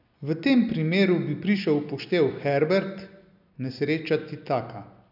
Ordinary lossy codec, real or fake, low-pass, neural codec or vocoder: none; real; 5.4 kHz; none